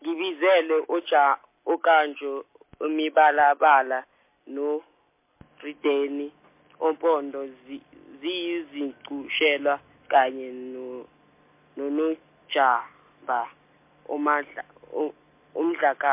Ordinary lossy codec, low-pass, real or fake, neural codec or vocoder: MP3, 24 kbps; 3.6 kHz; real; none